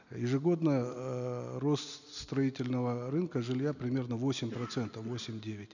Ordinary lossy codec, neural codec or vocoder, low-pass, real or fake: none; none; 7.2 kHz; real